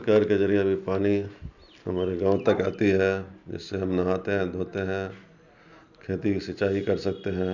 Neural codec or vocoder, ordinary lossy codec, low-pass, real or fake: none; none; 7.2 kHz; real